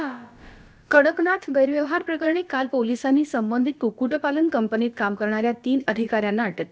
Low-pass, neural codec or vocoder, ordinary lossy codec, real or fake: none; codec, 16 kHz, about 1 kbps, DyCAST, with the encoder's durations; none; fake